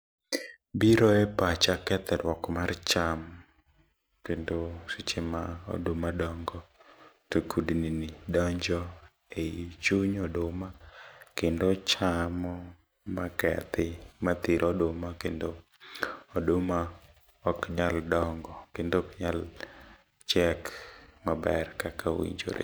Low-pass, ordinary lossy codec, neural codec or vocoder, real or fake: none; none; none; real